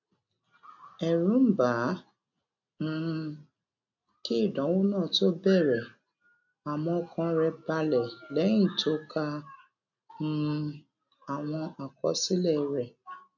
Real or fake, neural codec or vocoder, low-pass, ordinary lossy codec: real; none; 7.2 kHz; none